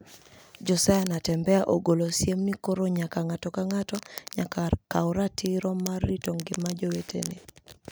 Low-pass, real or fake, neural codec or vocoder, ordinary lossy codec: none; real; none; none